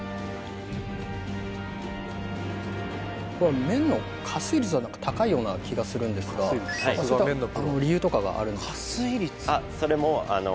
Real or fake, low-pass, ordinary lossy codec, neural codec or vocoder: real; none; none; none